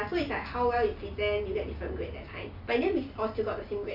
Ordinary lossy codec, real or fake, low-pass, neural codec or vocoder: Opus, 64 kbps; real; 5.4 kHz; none